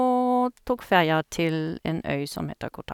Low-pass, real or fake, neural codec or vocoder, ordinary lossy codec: 19.8 kHz; real; none; none